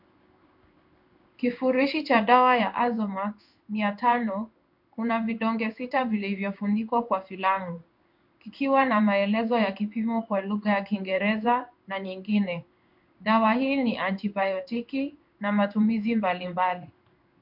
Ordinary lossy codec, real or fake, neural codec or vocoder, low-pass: MP3, 48 kbps; fake; codec, 16 kHz in and 24 kHz out, 1 kbps, XY-Tokenizer; 5.4 kHz